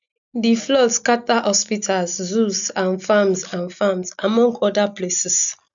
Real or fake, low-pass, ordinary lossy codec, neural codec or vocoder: real; 7.2 kHz; none; none